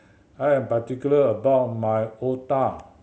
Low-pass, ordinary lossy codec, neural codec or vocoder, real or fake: none; none; none; real